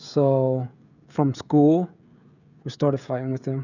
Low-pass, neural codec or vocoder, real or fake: 7.2 kHz; codec, 16 kHz, 16 kbps, FreqCodec, smaller model; fake